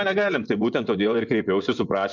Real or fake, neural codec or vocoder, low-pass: fake; vocoder, 24 kHz, 100 mel bands, Vocos; 7.2 kHz